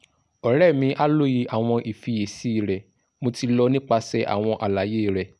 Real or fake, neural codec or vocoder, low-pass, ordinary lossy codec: real; none; none; none